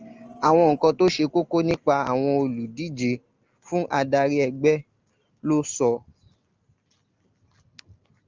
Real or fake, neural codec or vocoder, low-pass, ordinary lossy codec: real; none; 7.2 kHz; Opus, 24 kbps